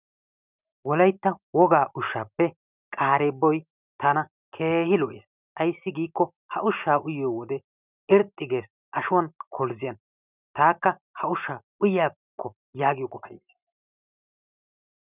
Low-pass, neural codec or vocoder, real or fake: 3.6 kHz; none; real